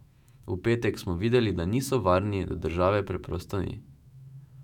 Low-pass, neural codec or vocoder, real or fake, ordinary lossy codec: 19.8 kHz; autoencoder, 48 kHz, 128 numbers a frame, DAC-VAE, trained on Japanese speech; fake; none